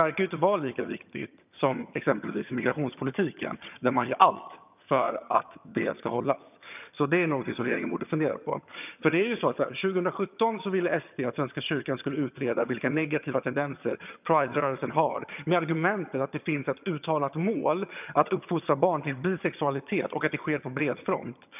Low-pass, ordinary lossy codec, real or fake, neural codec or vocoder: 3.6 kHz; none; fake; vocoder, 22.05 kHz, 80 mel bands, HiFi-GAN